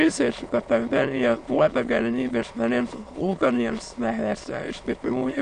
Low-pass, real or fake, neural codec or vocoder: 9.9 kHz; fake; autoencoder, 22.05 kHz, a latent of 192 numbers a frame, VITS, trained on many speakers